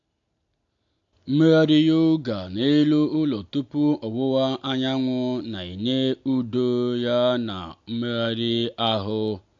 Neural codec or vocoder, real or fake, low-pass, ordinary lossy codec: none; real; 7.2 kHz; AAC, 64 kbps